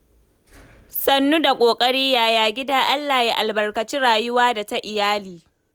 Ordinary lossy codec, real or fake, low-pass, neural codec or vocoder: none; real; none; none